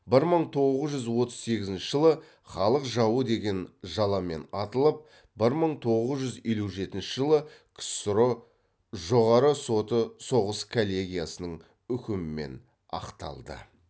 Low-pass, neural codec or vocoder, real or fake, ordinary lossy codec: none; none; real; none